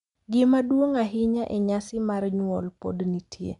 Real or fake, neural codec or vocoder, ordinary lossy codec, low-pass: real; none; MP3, 96 kbps; 10.8 kHz